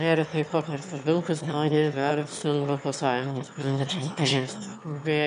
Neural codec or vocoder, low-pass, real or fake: autoencoder, 22.05 kHz, a latent of 192 numbers a frame, VITS, trained on one speaker; 9.9 kHz; fake